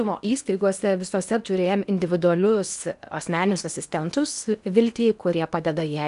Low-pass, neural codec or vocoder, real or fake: 10.8 kHz; codec, 16 kHz in and 24 kHz out, 0.8 kbps, FocalCodec, streaming, 65536 codes; fake